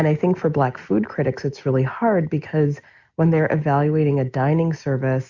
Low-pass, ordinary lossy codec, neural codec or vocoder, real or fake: 7.2 kHz; Opus, 64 kbps; none; real